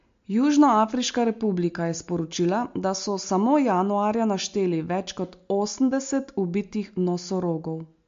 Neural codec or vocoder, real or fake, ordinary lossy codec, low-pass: none; real; MP3, 48 kbps; 7.2 kHz